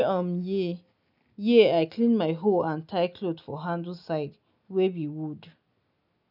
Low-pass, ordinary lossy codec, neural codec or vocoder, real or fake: 5.4 kHz; none; none; real